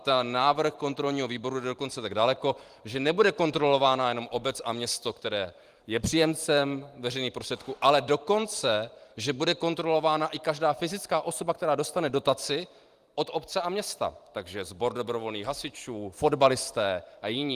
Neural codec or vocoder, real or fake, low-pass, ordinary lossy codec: vocoder, 44.1 kHz, 128 mel bands every 512 samples, BigVGAN v2; fake; 14.4 kHz; Opus, 24 kbps